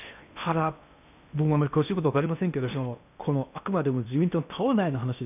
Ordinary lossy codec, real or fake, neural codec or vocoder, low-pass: none; fake; codec, 16 kHz in and 24 kHz out, 0.8 kbps, FocalCodec, streaming, 65536 codes; 3.6 kHz